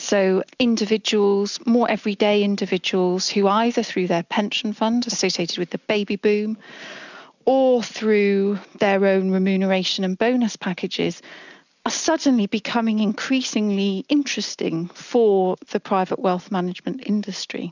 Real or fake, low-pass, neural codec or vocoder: real; 7.2 kHz; none